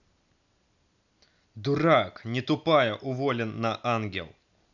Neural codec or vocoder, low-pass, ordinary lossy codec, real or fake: none; 7.2 kHz; none; real